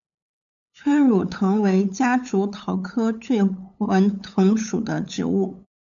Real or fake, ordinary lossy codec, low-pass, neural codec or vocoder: fake; MP3, 96 kbps; 7.2 kHz; codec, 16 kHz, 8 kbps, FunCodec, trained on LibriTTS, 25 frames a second